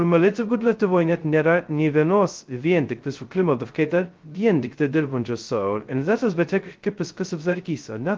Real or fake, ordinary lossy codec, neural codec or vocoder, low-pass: fake; Opus, 32 kbps; codec, 16 kHz, 0.2 kbps, FocalCodec; 7.2 kHz